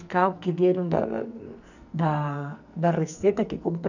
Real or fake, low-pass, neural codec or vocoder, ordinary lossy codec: fake; 7.2 kHz; codec, 44.1 kHz, 2.6 kbps, SNAC; none